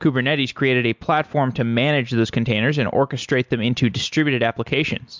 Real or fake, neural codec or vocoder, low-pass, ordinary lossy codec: real; none; 7.2 kHz; MP3, 64 kbps